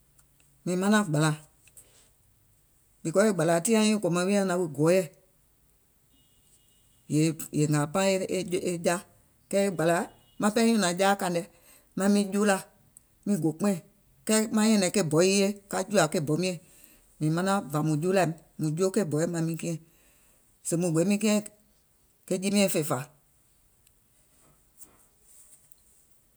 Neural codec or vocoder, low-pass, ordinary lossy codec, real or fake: none; none; none; real